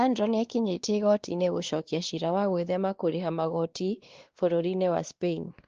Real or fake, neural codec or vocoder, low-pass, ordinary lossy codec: fake; codec, 16 kHz, 2 kbps, X-Codec, WavLM features, trained on Multilingual LibriSpeech; 7.2 kHz; Opus, 16 kbps